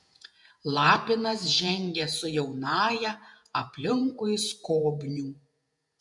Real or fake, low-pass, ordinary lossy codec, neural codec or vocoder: fake; 10.8 kHz; MP3, 64 kbps; vocoder, 48 kHz, 128 mel bands, Vocos